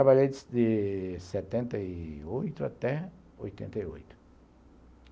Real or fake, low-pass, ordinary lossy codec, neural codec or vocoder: real; none; none; none